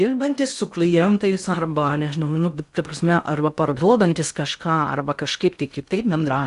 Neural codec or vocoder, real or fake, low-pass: codec, 16 kHz in and 24 kHz out, 0.6 kbps, FocalCodec, streaming, 2048 codes; fake; 10.8 kHz